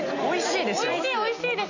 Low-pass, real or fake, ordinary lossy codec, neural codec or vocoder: 7.2 kHz; real; none; none